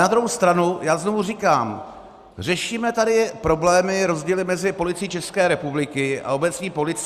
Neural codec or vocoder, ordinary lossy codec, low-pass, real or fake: none; Opus, 64 kbps; 14.4 kHz; real